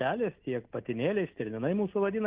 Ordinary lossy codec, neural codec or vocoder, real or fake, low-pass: Opus, 32 kbps; none; real; 3.6 kHz